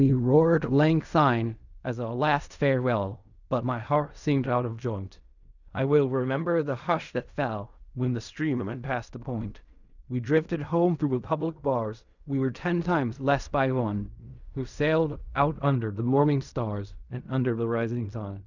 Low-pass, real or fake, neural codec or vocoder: 7.2 kHz; fake; codec, 16 kHz in and 24 kHz out, 0.4 kbps, LongCat-Audio-Codec, fine tuned four codebook decoder